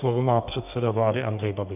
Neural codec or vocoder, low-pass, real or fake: codec, 32 kHz, 1.9 kbps, SNAC; 3.6 kHz; fake